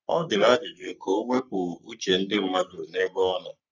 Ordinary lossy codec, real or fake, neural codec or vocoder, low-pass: none; fake; codec, 44.1 kHz, 3.4 kbps, Pupu-Codec; 7.2 kHz